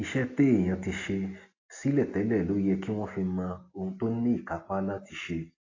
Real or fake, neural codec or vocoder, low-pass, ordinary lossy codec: real; none; 7.2 kHz; AAC, 32 kbps